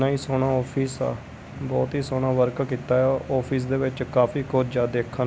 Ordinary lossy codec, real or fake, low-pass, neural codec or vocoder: none; real; none; none